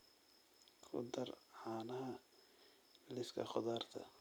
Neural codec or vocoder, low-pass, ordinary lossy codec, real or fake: vocoder, 44.1 kHz, 128 mel bands every 256 samples, BigVGAN v2; none; none; fake